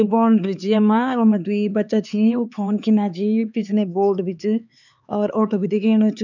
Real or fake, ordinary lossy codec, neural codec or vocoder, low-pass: fake; none; codec, 16 kHz, 4 kbps, X-Codec, HuBERT features, trained on LibriSpeech; 7.2 kHz